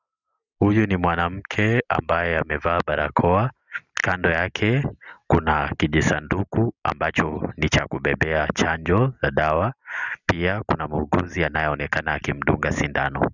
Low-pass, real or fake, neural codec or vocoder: 7.2 kHz; real; none